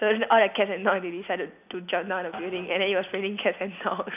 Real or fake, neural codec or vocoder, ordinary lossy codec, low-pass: real; none; none; 3.6 kHz